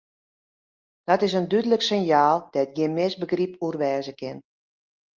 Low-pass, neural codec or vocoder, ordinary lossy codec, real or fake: 7.2 kHz; none; Opus, 24 kbps; real